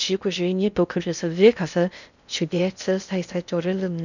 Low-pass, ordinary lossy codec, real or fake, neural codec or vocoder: 7.2 kHz; none; fake; codec, 16 kHz in and 24 kHz out, 0.6 kbps, FocalCodec, streaming, 4096 codes